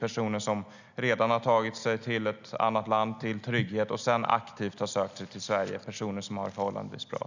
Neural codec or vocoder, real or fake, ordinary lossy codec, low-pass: none; real; none; 7.2 kHz